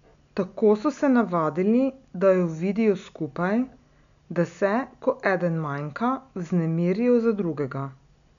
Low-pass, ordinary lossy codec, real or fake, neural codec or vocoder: 7.2 kHz; none; real; none